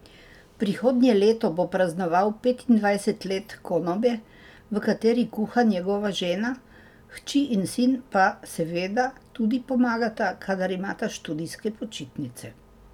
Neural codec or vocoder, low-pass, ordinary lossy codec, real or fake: none; 19.8 kHz; none; real